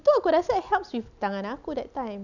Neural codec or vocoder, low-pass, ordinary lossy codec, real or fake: none; 7.2 kHz; none; real